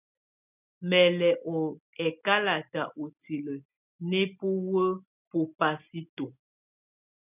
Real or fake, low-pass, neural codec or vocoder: real; 3.6 kHz; none